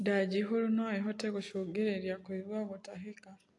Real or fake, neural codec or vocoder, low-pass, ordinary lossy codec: real; none; 10.8 kHz; AAC, 48 kbps